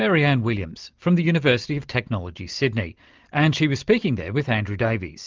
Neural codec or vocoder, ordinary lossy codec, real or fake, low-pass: none; Opus, 24 kbps; real; 7.2 kHz